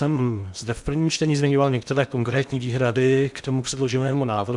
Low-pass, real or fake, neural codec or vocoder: 10.8 kHz; fake; codec, 16 kHz in and 24 kHz out, 0.8 kbps, FocalCodec, streaming, 65536 codes